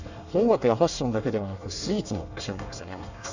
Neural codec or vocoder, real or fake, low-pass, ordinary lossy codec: codec, 24 kHz, 1 kbps, SNAC; fake; 7.2 kHz; none